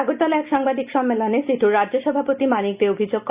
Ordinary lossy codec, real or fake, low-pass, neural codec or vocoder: Opus, 64 kbps; real; 3.6 kHz; none